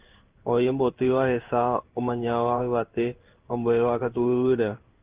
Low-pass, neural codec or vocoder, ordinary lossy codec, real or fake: 3.6 kHz; codec, 16 kHz in and 24 kHz out, 1 kbps, XY-Tokenizer; Opus, 32 kbps; fake